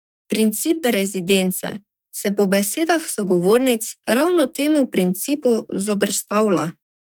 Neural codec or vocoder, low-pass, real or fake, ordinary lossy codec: codec, 44.1 kHz, 2.6 kbps, SNAC; none; fake; none